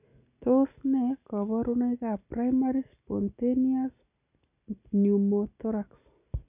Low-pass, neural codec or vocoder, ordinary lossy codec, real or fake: 3.6 kHz; none; none; real